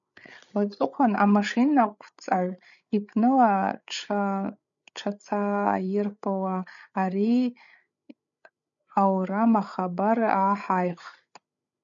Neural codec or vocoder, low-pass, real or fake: codec, 16 kHz, 8 kbps, FreqCodec, larger model; 7.2 kHz; fake